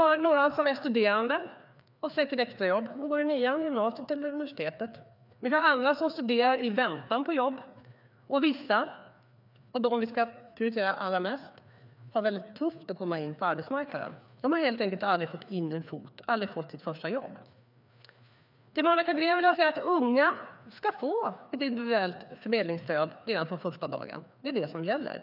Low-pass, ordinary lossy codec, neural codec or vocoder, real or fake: 5.4 kHz; none; codec, 16 kHz, 2 kbps, FreqCodec, larger model; fake